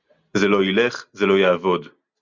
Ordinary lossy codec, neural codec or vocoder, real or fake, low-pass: Opus, 64 kbps; none; real; 7.2 kHz